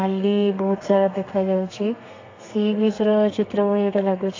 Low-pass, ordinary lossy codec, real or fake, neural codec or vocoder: 7.2 kHz; AAC, 48 kbps; fake; codec, 32 kHz, 1.9 kbps, SNAC